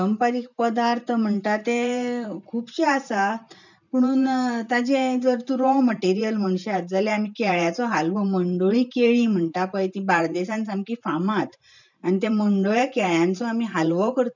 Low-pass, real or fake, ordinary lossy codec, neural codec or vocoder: 7.2 kHz; fake; none; vocoder, 44.1 kHz, 128 mel bands every 512 samples, BigVGAN v2